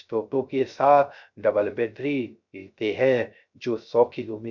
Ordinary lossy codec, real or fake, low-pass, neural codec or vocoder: none; fake; 7.2 kHz; codec, 16 kHz, 0.3 kbps, FocalCodec